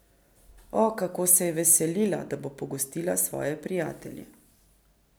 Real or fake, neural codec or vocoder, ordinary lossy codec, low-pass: real; none; none; none